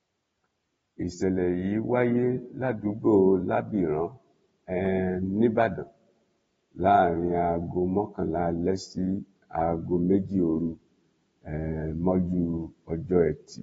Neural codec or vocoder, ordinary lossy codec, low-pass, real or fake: none; AAC, 24 kbps; 19.8 kHz; real